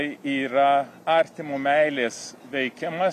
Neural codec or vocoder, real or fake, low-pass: none; real; 14.4 kHz